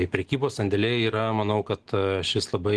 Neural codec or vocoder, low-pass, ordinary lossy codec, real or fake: none; 10.8 kHz; Opus, 16 kbps; real